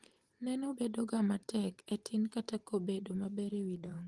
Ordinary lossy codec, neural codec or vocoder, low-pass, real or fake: Opus, 24 kbps; vocoder, 44.1 kHz, 128 mel bands every 512 samples, BigVGAN v2; 10.8 kHz; fake